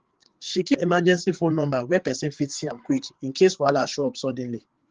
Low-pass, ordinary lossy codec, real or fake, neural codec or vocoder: 7.2 kHz; Opus, 16 kbps; fake; codec, 16 kHz, 4 kbps, FreqCodec, larger model